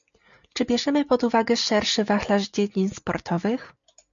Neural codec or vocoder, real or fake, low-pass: none; real; 7.2 kHz